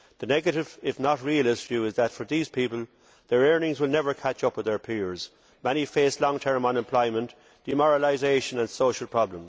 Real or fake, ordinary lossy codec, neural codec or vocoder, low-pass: real; none; none; none